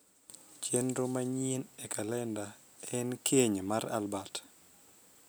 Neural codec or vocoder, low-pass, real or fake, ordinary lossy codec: none; none; real; none